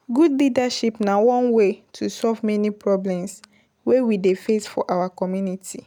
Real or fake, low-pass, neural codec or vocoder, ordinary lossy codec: real; none; none; none